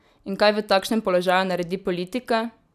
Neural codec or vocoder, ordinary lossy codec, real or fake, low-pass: none; none; real; 14.4 kHz